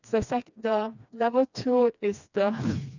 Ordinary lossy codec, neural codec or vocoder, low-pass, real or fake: none; codec, 16 kHz, 2 kbps, FreqCodec, smaller model; 7.2 kHz; fake